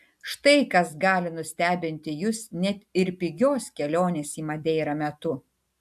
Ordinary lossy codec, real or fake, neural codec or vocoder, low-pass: AAC, 96 kbps; real; none; 14.4 kHz